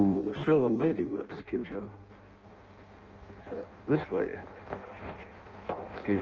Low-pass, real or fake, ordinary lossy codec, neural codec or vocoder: 7.2 kHz; fake; Opus, 24 kbps; codec, 16 kHz in and 24 kHz out, 0.6 kbps, FireRedTTS-2 codec